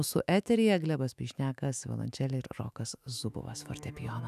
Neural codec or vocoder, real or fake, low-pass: autoencoder, 48 kHz, 128 numbers a frame, DAC-VAE, trained on Japanese speech; fake; 14.4 kHz